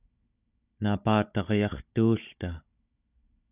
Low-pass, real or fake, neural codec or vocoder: 3.6 kHz; fake; codec, 16 kHz, 16 kbps, FunCodec, trained on Chinese and English, 50 frames a second